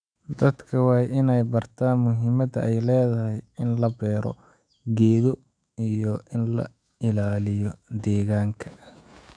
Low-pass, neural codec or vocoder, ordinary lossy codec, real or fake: 9.9 kHz; autoencoder, 48 kHz, 128 numbers a frame, DAC-VAE, trained on Japanese speech; none; fake